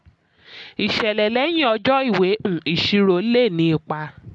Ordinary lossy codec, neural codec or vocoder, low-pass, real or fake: none; none; none; real